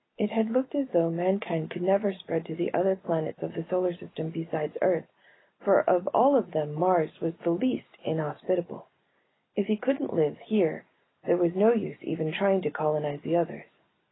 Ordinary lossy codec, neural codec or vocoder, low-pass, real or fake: AAC, 16 kbps; none; 7.2 kHz; real